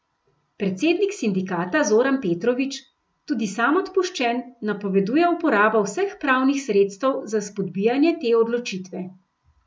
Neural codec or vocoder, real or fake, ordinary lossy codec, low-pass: none; real; none; none